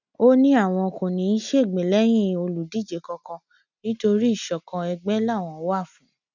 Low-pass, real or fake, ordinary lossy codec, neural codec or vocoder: 7.2 kHz; real; none; none